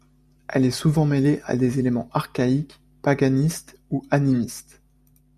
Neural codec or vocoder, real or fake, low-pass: none; real; 14.4 kHz